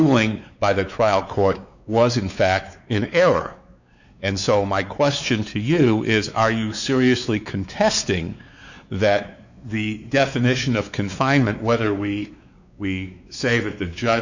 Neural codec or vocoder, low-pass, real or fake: codec, 16 kHz, 4 kbps, X-Codec, WavLM features, trained on Multilingual LibriSpeech; 7.2 kHz; fake